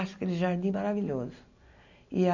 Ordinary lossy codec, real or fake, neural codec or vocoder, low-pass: none; real; none; 7.2 kHz